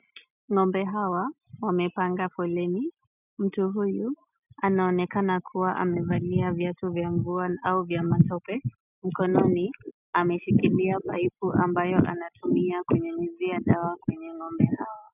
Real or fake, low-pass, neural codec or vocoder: real; 3.6 kHz; none